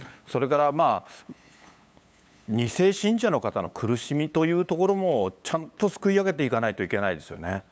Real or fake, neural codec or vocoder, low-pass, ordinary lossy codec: fake; codec, 16 kHz, 8 kbps, FunCodec, trained on LibriTTS, 25 frames a second; none; none